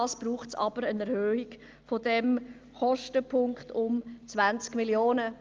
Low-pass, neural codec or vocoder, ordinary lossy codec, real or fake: 7.2 kHz; none; Opus, 24 kbps; real